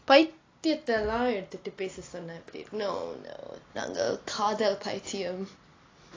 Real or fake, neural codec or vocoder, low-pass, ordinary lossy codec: real; none; 7.2 kHz; AAC, 32 kbps